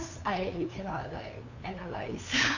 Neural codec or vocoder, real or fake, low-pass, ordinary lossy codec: codec, 16 kHz, 2 kbps, FunCodec, trained on LibriTTS, 25 frames a second; fake; 7.2 kHz; none